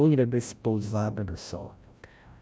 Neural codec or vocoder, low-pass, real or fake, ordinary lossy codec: codec, 16 kHz, 0.5 kbps, FreqCodec, larger model; none; fake; none